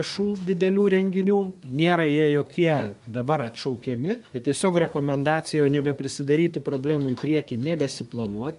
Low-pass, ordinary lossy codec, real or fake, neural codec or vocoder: 10.8 kHz; MP3, 96 kbps; fake; codec, 24 kHz, 1 kbps, SNAC